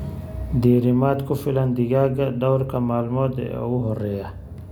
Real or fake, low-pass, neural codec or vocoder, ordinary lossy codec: real; 19.8 kHz; none; none